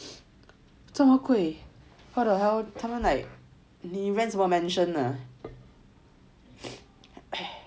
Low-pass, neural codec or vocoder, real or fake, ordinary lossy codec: none; none; real; none